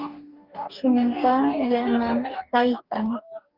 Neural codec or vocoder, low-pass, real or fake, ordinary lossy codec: codec, 44.1 kHz, 2.6 kbps, DAC; 5.4 kHz; fake; Opus, 24 kbps